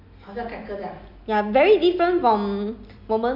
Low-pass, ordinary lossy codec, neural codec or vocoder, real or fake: 5.4 kHz; none; none; real